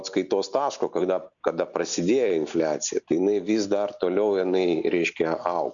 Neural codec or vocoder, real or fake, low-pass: none; real; 7.2 kHz